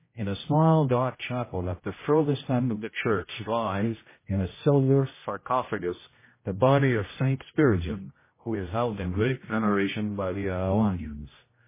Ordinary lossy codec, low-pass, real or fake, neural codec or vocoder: MP3, 16 kbps; 3.6 kHz; fake; codec, 16 kHz, 0.5 kbps, X-Codec, HuBERT features, trained on general audio